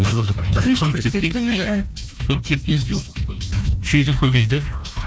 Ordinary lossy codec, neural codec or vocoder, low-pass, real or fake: none; codec, 16 kHz, 1 kbps, FunCodec, trained on Chinese and English, 50 frames a second; none; fake